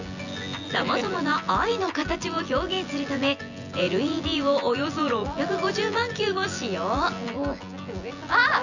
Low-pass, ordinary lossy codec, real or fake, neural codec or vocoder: 7.2 kHz; none; fake; vocoder, 24 kHz, 100 mel bands, Vocos